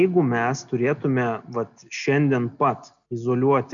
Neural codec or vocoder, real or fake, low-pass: none; real; 7.2 kHz